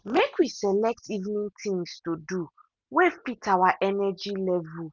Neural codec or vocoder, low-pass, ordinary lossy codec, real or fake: none; none; none; real